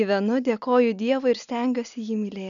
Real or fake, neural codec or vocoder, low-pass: real; none; 7.2 kHz